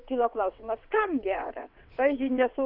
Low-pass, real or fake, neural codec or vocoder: 5.4 kHz; fake; vocoder, 22.05 kHz, 80 mel bands, WaveNeXt